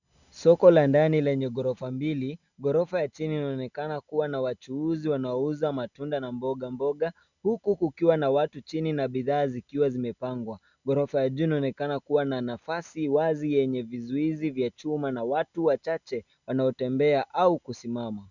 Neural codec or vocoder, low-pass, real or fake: none; 7.2 kHz; real